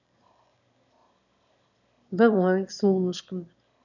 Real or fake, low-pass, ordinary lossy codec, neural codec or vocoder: fake; 7.2 kHz; none; autoencoder, 22.05 kHz, a latent of 192 numbers a frame, VITS, trained on one speaker